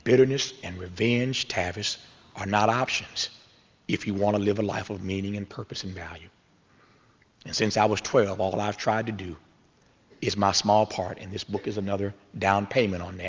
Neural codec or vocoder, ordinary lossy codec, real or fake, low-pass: none; Opus, 32 kbps; real; 7.2 kHz